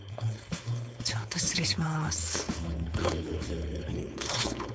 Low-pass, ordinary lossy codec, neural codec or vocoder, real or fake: none; none; codec, 16 kHz, 4.8 kbps, FACodec; fake